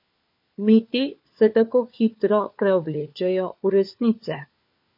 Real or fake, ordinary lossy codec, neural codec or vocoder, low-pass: fake; MP3, 32 kbps; codec, 16 kHz, 4 kbps, FunCodec, trained on LibriTTS, 50 frames a second; 5.4 kHz